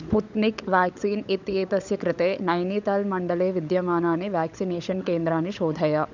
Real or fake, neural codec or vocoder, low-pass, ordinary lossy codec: fake; codec, 16 kHz, 16 kbps, FunCodec, trained on LibriTTS, 50 frames a second; 7.2 kHz; none